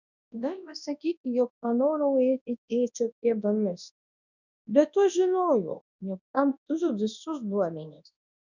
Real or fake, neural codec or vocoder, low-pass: fake; codec, 24 kHz, 0.9 kbps, WavTokenizer, large speech release; 7.2 kHz